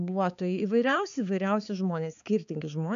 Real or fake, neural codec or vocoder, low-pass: fake; codec, 16 kHz, 4 kbps, X-Codec, HuBERT features, trained on balanced general audio; 7.2 kHz